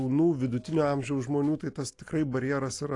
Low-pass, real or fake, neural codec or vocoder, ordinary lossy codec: 10.8 kHz; real; none; AAC, 48 kbps